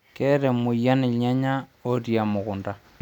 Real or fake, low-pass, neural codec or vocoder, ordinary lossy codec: real; 19.8 kHz; none; none